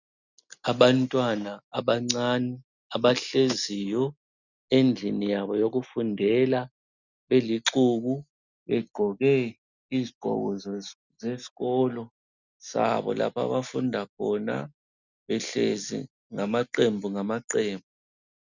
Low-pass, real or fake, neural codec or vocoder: 7.2 kHz; real; none